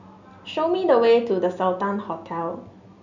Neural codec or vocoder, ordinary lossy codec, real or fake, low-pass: none; none; real; 7.2 kHz